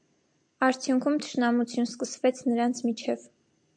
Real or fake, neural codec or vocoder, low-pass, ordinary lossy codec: real; none; 9.9 kHz; MP3, 64 kbps